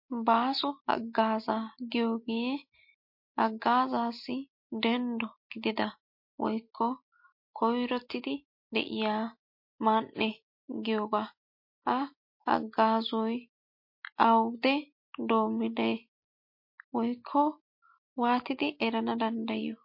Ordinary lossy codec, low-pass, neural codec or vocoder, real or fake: MP3, 32 kbps; 5.4 kHz; none; real